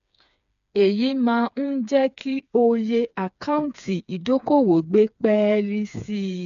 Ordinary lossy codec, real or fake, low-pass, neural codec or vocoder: none; fake; 7.2 kHz; codec, 16 kHz, 4 kbps, FreqCodec, smaller model